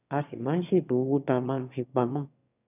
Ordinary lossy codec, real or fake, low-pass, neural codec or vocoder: none; fake; 3.6 kHz; autoencoder, 22.05 kHz, a latent of 192 numbers a frame, VITS, trained on one speaker